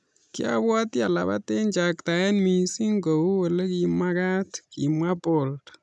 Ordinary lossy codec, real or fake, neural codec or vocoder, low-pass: none; real; none; none